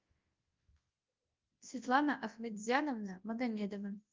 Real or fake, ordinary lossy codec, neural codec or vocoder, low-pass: fake; Opus, 32 kbps; codec, 24 kHz, 0.9 kbps, WavTokenizer, large speech release; 7.2 kHz